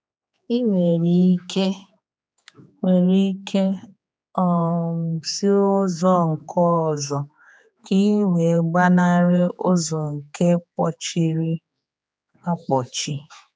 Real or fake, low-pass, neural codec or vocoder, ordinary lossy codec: fake; none; codec, 16 kHz, 4 kbps, X-Codec, HuBERT features, trained on general audio; none